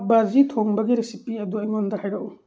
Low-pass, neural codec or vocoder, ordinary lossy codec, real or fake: none; none; none; real